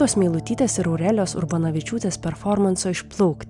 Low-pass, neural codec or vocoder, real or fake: 10.8 kHz; none; real